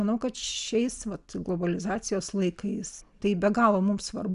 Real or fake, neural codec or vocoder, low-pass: real; none; 10.8 kHz